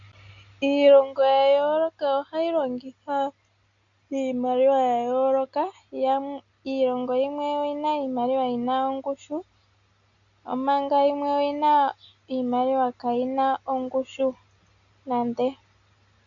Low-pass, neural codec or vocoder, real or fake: 7.2 kHz; none; real